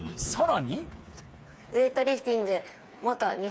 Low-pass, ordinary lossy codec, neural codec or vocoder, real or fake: none; none; codec, 16 kHz, 4 kbps, FreqCodec, smaller model; fake